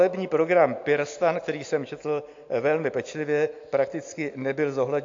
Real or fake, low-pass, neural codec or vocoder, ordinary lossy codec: real; 7.2 kHz; none; AAC, 48 kbps